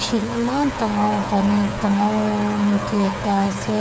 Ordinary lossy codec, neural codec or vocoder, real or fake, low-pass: none; codec, 16 kHz, 8 kbps, FreqCodec, smaller model; fake; none